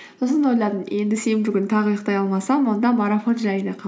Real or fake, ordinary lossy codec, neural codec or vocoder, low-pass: real; none; none; none